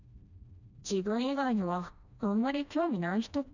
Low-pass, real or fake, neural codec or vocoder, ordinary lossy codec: 7.2 kHz; fake; codec, 16 kHz, 1 kbps, FreqCodec, smaller model; none